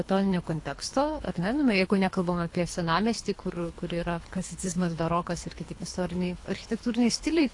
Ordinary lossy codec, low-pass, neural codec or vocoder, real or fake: AAC, 48 kbps; 10.8 kHz; codec, 24 kHz, 3 kbps, HILCodec; fake